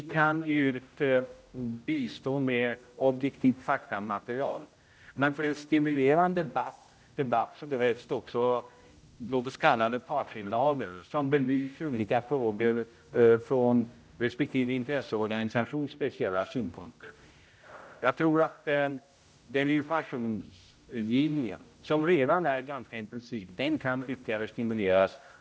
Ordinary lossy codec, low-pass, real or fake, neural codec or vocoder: none; none; fake; codec, 16 kHz, 0.5 kbps, X-Codec, HuBERT features, trained on general audio